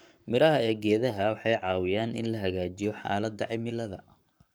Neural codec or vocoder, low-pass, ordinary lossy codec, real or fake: codec, 44.1 kHz, 7.8 kbps, Pupu-Codec; none; none; fake